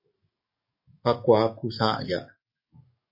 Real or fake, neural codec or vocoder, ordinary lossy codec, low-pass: fake; codec, 44.1 kHz, 7.8 kbps, DAC; MP3, 24 kbps; 5.4 kHz